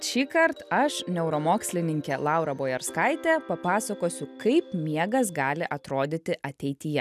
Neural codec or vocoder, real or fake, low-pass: none; real; 14.4 kHz